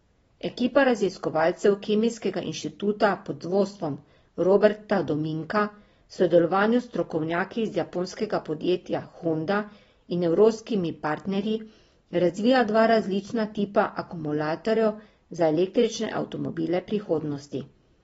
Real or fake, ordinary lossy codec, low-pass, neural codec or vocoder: real; AAC, 24 kbps; 19.8 kHz; none